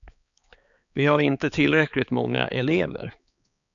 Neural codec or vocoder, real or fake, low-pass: codec, 16 kHz, 4 kbps, X-Codec, HuBERT features, trained on balanced general audio; fake; 7.2 kHz